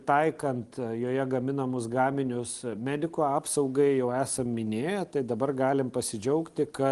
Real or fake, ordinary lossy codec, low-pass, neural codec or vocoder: real; Opus, 24 kbps; 10.8 kHz; none